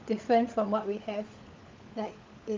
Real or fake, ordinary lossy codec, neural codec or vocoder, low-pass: fake; Opus, 24 kbps; codec, 16 kHz, 16 kbps, FunCodec, trained on LibriTTS, 50 frames a second; 7.2 kHz